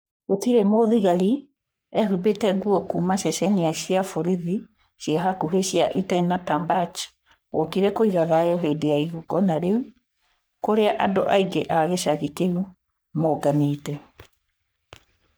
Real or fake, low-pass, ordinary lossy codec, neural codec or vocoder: fake; none; none; codec, 44.1 kHz, 3.4 kbps, Pupu-Codec